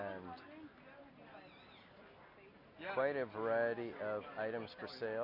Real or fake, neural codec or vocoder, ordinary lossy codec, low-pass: real; none; MP3, 48 kbps; 5.4 kHz